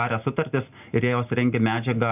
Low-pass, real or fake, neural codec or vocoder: 3.6 kHz; real; none